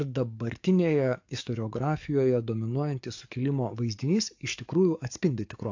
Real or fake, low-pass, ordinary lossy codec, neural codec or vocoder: fake; 7.2 kHz; AAC, 48 kbps; codec, 44.1 kHz, 7.8 kbps, DAC